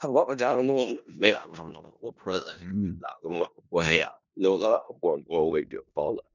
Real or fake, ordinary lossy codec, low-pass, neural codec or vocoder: fake; none; 7.2 kHz; codec, 16 kHz in and 24 kHz out, 0.4 kbps, LongCat-Audio-Codec, four codebook decoder